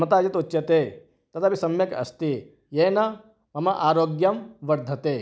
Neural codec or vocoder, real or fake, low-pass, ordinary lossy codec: none; real; none; none